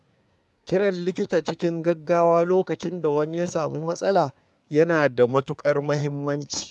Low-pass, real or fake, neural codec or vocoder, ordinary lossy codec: none; fake; codec, 24 kHz, 1 kbps, SNAC; none